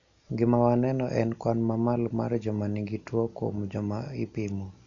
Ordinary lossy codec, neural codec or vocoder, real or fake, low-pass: none; none; real; 7.2 kHz